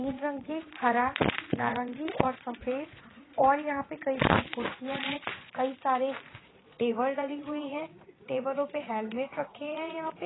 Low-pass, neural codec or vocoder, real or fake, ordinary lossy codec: 7.2 kHz; vocoder, 22.05 kHz, 80 mel bands, Vocos; fake; AAC, 16 kbps